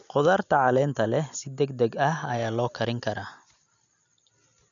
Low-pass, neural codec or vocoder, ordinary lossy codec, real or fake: 7.2 kHz; none; none; real